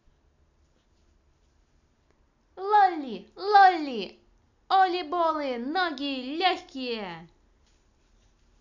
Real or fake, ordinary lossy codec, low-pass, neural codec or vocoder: real; none; 7.2 kHz; none